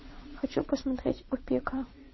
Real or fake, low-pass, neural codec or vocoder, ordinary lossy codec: fake; 7.2 kHz; vocoder, 22.05 kHz, 80 mel bands, WaveNeXt; MP3, 24 kbps